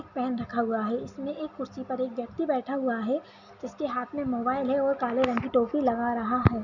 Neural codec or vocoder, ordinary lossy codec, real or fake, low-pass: none; none; real; 7.2 kHz